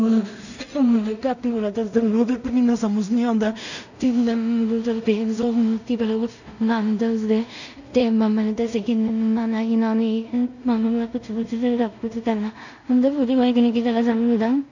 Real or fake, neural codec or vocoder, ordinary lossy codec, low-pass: fake; codec, 16 kHz in and 24 kHz out, 0.4 kbps, LongCat-Audio-Codec, two codebook decoder; none; 7.2 kHz